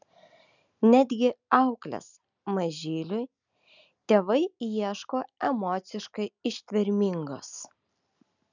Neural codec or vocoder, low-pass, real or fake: none; 7.2 kHz; real